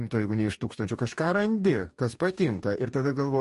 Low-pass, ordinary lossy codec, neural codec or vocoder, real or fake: 14.4 kHz; MP3, 48 kbps; codec, 44.1 kHz, 2.6 kbps, DAC; fake